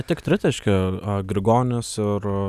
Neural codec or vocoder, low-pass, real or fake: none; 14.4 kHz; real